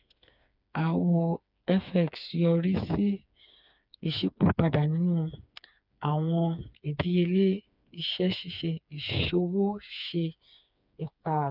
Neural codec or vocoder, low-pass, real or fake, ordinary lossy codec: codec, 16 kHz, 4 kbps, FreqCodec, smaller model; 5.4 kHz; fake; none